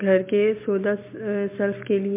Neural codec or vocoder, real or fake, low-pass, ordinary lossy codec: none; real; 3.6 kHz; MP3, 24 kbps